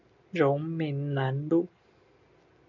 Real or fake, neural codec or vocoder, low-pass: real; none; 7.2 kHz